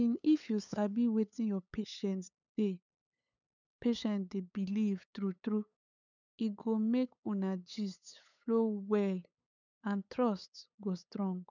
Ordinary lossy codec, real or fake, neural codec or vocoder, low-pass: none; fake; codec, 16 kHz, 8 kbps, FunCodec, trained on LibriTTS, 25 frames a second; 7.2 kHz